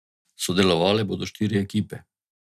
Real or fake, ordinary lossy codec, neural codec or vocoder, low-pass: real; none; none; 14.4 kHz